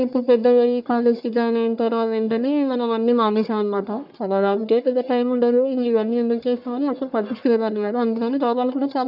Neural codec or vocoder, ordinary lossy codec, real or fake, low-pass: codec, 44.1 kHz, 1.7 kbps, Pupu-Codec; none; fake; 5.4 kHz